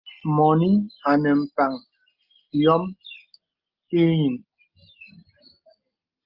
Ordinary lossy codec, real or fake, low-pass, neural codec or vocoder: Opus, 24 kbps; real; 5.4 kHz; none